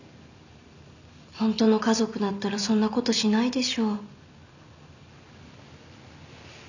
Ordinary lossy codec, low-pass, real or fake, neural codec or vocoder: none; 7.2 kHz; real; none